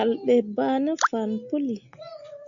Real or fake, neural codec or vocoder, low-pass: real; none; 7.2 kHz